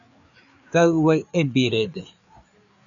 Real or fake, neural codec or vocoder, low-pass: fake; codec, 16 kHz, 4 kbps, FreqCodec, larger model; 7.2 kHz